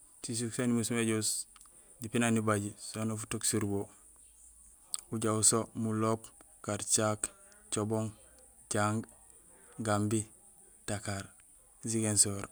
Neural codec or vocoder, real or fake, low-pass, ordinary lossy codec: none; real; none; none